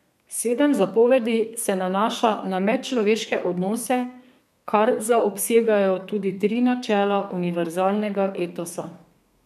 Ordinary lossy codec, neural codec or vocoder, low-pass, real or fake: none; codec, 32 kHz, 1.9 kbps, SNAC; 14.4 kHz; fake